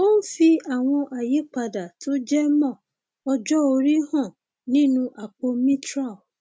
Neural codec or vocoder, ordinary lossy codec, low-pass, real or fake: none; none; none; real